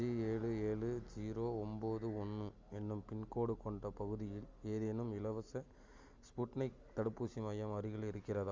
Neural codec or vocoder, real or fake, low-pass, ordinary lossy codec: none; real; 7.2 kHz; Opus, 32 kbps